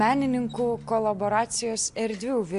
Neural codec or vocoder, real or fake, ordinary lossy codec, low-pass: none; real; AAC, 64 kbps; 10.8 kHz